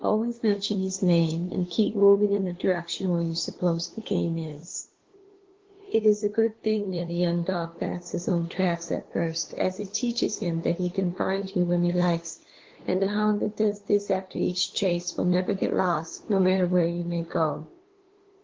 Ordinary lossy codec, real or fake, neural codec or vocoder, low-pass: Opus, 16 kbps; fake; codec, 16 kHz, 2 kbps, FunCodec, trained on LibriTTS, 25 frames a second; 7.2 kHz